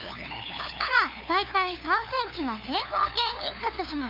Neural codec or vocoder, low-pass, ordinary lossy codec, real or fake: codec, 16 kHz, 2 kbps, FunCodec, trained on LibriTTS, 25 frames a second; 5.4 kHz; MP3, 48 kbps; fake